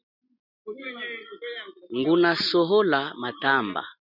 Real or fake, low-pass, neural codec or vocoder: real; 5.4 kHz; none